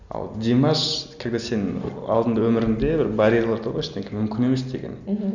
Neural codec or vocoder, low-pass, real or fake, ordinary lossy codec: none; 7.2 kHz; real; none